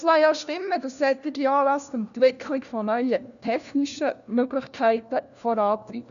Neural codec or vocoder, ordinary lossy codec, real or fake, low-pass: codec, 16 kHz, 1 kbps, FunCodec, trained on LibriTTS, 50 frames a second; none; fake; 7.2 kHz